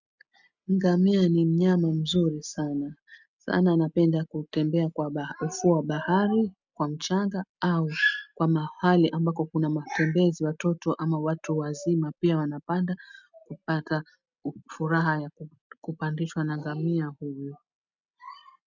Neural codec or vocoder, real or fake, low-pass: none; real; 7.2 kHz